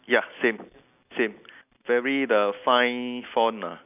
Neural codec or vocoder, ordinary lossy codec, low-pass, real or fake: none; none; 3.6 kHz; real